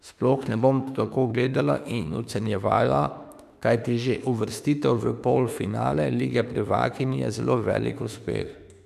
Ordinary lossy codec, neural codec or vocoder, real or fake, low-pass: none; autoencoder, 48 kHz, 32 numbers a frame, DAC-VAE, trained on Japanese speech; fake; 14.4 kHz